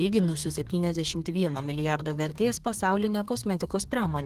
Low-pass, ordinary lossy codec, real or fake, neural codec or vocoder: 14.4 kHz; Opus, 24 kbps; fake; codec, 32 kHz, 1.9 kbps, SNAC